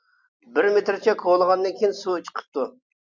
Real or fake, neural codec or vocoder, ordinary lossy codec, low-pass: real; none; MP3, 64 kbps; 7.2 kHz